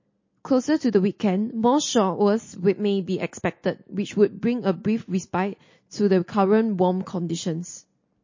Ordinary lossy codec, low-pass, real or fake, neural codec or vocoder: MP3, 32 kbps; 7.2 kHz; real; none